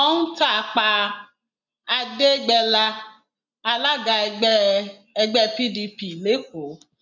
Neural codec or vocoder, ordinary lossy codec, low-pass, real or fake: none; none; 7.2 kHz; real